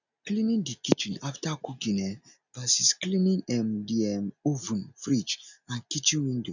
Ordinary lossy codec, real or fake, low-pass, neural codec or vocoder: none; real; 7.2 kHz; none